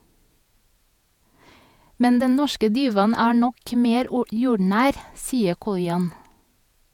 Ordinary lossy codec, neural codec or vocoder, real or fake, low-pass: none; vocoder, 48 kHz, 128 mel bands, Vocos; fake; 19.8 kHz